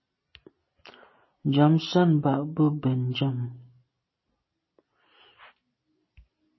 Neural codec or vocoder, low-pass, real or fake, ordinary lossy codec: none; 7.2 kHz; real; MP3, 24 kbps